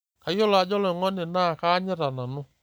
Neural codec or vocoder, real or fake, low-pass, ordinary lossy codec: none; real; none; none